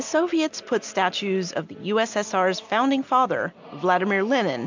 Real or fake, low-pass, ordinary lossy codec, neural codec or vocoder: real; 7.2 kHz; MP3, 64 kbps; none